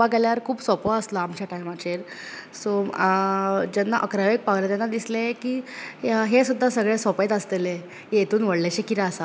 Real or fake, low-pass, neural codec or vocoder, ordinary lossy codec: real; none; none; none